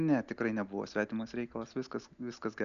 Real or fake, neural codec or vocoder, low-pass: real; none; 7.2 kHz